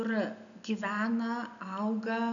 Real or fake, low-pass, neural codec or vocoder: real; 7.2 kHz; none